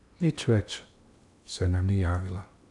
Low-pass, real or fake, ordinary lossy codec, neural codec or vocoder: 10.8 kHz; fake; none; codec, 16 kHz in and 24 kHz out, 0.8 kbps, FocalCodec, streaming, 65536 codes